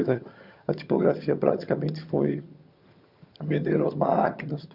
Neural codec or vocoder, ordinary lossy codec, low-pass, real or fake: vocoder, 22.05 kHz, 80 mel bands, HiFi-GAN; Opus, 64 kbps; 5.4 kHz; fake